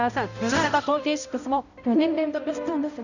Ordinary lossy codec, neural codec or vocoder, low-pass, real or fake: none; codec, 16 kHz, 0.5 kbps, X-Codec, HuBERT features, trained on balanced general audio; 7.2 kHz; fake